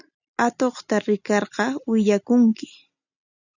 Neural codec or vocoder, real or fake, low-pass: none; real; 7.2 kHz